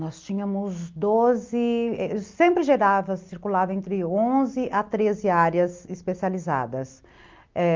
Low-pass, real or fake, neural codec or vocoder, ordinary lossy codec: 7.2 kHz; real; none; Opus, 24 kbps